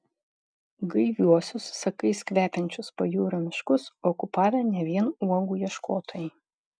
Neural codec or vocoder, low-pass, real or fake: none; 9.9 kHz; real